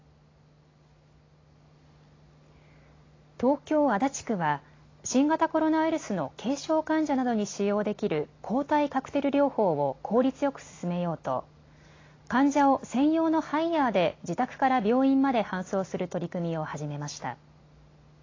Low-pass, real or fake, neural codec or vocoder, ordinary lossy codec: 7.2 kHz; real; none; AAC, 32 kbps